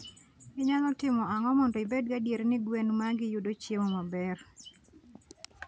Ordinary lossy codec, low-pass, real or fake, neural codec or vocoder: none; none; real; none